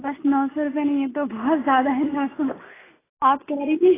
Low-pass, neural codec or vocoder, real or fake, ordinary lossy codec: 3.6 kHz; none; real; AAC, 16 kbps